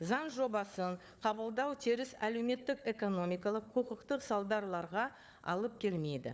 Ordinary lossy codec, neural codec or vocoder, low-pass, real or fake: none; codec, 16 kHz, 4 kbps, FunCodec, trained on Chinese and English, 50 frames a second; none; fake